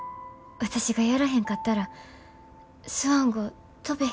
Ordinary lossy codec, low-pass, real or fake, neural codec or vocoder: none; none; real; none